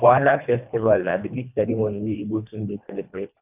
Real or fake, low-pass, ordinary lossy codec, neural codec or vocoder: fake; 3.6 kHz; none; codec, 24 kHz, 1.5 kbps, HILCodec